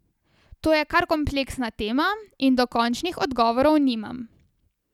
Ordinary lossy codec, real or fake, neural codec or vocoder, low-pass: none; real; none; 19.8 kHz